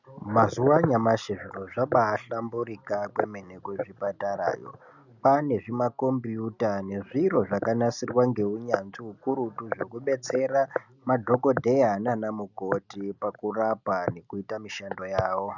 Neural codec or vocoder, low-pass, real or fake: none; 7.2 kHz; real